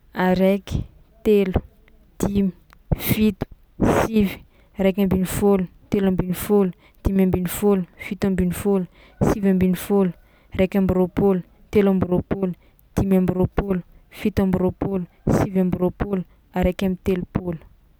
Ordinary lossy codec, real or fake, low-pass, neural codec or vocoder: none; real; none; none